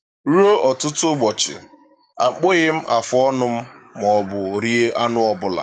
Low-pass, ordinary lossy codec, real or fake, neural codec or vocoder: 9.9 kHz; none; fake; codec, 44.1 kHz, 7.8 kbps, DAC